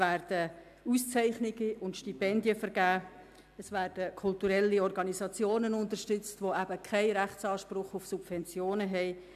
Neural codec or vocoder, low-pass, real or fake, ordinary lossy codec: none; 14.4 kHz; real; AAC, 96 kbps